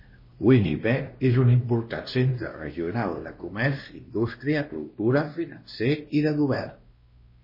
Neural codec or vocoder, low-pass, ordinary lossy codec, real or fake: codec, 16 kHz, 1 kbps, X-Codec, WavLM features, trained on Multilingual LibriSpeech; 5.4 kHz; MP3, 24 kbps; fake